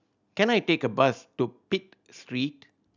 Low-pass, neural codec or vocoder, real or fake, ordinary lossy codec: 7.2 kHz; none; real; none